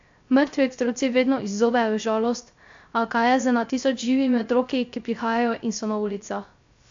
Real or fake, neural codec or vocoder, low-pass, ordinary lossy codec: fake; codec, 16 kHz, 0.3 kbps, FocalCodec; 7.2 kHz; AAC, 64 kbps